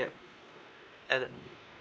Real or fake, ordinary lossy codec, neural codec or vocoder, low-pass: fake; none; codec, 16 kHz, 2 kbps, X-Codec, WavLM features, trained on Multilingual LibriSpeech; none